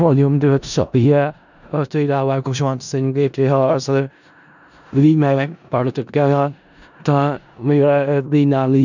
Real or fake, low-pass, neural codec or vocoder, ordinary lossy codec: fake; 7.2 kHz; codec, 16 kHz in and 24 kHz out, 0.4 kbps, LongCat-Audio-Codec, four codebook decoder; none